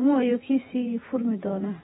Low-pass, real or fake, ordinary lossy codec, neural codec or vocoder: 19.8 kHz; fake; AAC, 16 kbps; vocoder, 48 kHz, 128 mel bands, Vocos